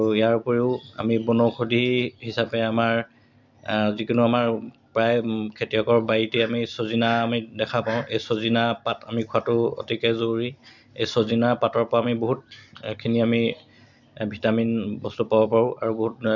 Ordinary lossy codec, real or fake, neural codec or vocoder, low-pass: none; real; none; 7.2 kHz